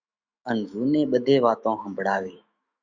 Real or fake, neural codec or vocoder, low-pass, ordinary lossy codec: fake; autoencoder, 48 kHz, 128 numbers a frame, DAC-VAE, trained on Japanese speech; 7.2 kHz; Opus, 64 kbps